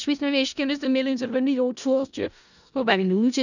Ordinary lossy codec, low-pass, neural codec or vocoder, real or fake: none; 7.2 kHz; codec, 16 kHz in and 24 kHz out, 0.4 kbps, LongCat-Audio-Codec, four codebook decoder; fake